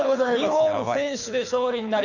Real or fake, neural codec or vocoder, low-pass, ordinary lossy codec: fake; codec, 24 kHz, 6 kbps, HILCodec; 7.2 kHz; none